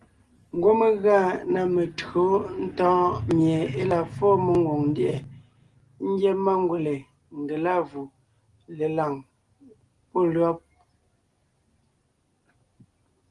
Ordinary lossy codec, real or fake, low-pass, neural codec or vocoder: Opus, 24 kbps; real; 10.8 kHz; none